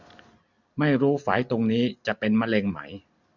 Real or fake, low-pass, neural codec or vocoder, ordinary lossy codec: real; 7.2 kHz; none; none